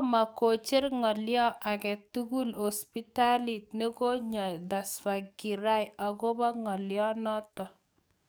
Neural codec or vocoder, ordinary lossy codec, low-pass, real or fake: codec, 44.1 kHz, 7.8 kbps, DAC; none; none; fake